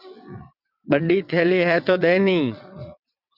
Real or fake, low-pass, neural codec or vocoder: fake; 5.4 kHz; vocoder, 22.05 kHz, 80 mel bands, WaveNeXt